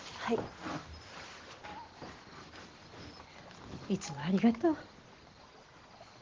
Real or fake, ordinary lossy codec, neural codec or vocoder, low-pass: real; Opus, 16 kbps; none; 7.2 kHz